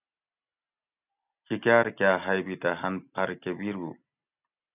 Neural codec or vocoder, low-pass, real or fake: none; 3.6 kHz; real